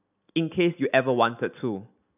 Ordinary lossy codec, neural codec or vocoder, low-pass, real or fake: none; none; 3.6 kHz; real